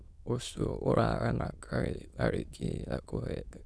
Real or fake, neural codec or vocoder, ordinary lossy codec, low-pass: fake; autoencoder, 22.05 kHz, a latent of 192 numbers a frame, VITS, trained on many speakers; none; none